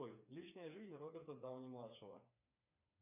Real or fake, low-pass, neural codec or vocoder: fake; 3.6 kHz; codec, 16 kHz, 4 kbps, FreqCodec, smaller model